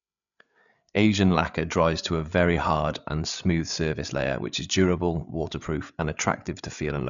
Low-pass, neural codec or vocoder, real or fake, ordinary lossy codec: 7.2 kHz; codec, 16 kHz, 8 kbps, FreqCodec, larger model; fake; none